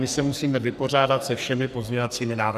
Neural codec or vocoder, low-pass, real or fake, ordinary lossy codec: codec, 44.1 kHz, 2.6 kbps, SNAC; 14.4 kHz; fake; Opus, 64 kbps